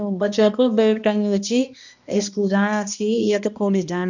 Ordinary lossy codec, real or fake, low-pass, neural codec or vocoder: none; fake; 7.2 kHz; codec, 16 kHz, 1 kbps, X-Codec, HuBERT features, trained on balanced general audio